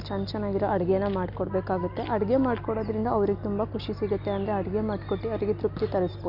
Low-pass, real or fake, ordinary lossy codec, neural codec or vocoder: 5.4 kHz; real; none; none